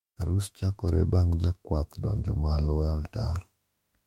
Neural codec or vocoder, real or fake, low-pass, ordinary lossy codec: autoencoder, 48 kHz, 32 numbers a frame, DAC-VAE, trained on Japanese speech; fake; 19.8 kHz; MP3, 64 kbps